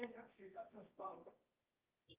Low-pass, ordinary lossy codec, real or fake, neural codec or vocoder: 3.6 kHz; Opus, 32 kbps; fake; codec, 24 kHz, 0.9 kbps, WavTokenizer, medium music audio release